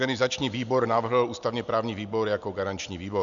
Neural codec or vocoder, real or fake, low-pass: none; real; 7.2 kHz